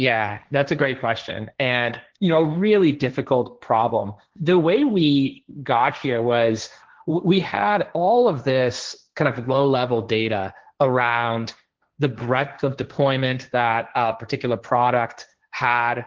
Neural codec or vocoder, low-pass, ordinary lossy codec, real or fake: codec, 16 kHz, 1.1 kbps, Voila-Tokenizer; 7.2 kHz; Opus, 16 kbps; fake